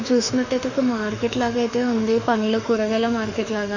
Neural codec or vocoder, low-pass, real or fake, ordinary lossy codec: autoencoder, 48 kHz, 32 numbers a frame, DAC-VAE, trained on Japanese speech; 7.2 kHz; fake; none